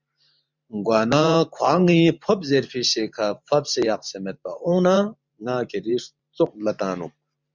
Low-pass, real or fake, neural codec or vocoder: 7.2 kHz; fake; vocoder, 44.1 kHz, 128 mel bands every 512 samples, BigVGAN v2